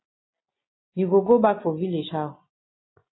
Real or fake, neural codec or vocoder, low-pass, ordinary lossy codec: real; none; 7.2 kHz; AAC, 16 kbps